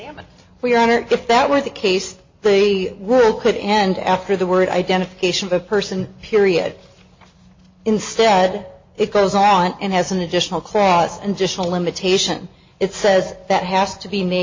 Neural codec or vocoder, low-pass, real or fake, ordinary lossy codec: none; 7.2 kHz; real; MP3, 32 kbps